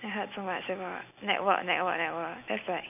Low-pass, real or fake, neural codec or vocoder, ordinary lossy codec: 3.6 kHz; real; none; none